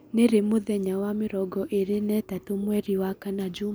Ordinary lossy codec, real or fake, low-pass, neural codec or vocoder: none; real; none; none